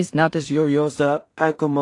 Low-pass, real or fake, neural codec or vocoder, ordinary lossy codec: 10.8 kHz; fake; codec, 16 kHz in and 24 kHz out, 0.4 kbps, LongCat-Audio-Codec, two codebook decoder; AAC, 48 kbps